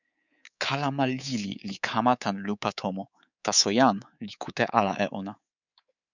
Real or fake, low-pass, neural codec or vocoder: fake; 7.2 kHz; codec, 24 kHz, 3.1 kbps, DualCodec